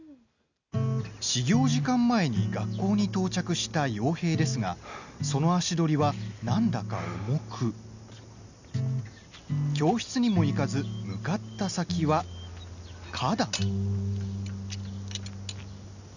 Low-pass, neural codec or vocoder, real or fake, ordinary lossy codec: 7.2 kHz; none; real; none